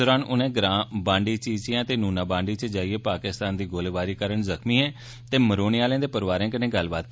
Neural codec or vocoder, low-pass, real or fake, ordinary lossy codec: none; none; real; none